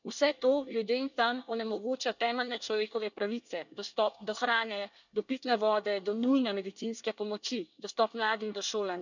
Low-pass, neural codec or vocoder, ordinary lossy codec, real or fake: 7.2 kHz; codec, 24 kHz, 1 kbps, SNAC; none; fake